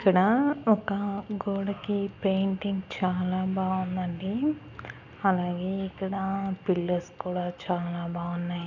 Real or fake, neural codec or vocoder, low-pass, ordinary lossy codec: real; none; 7.2 kHz; none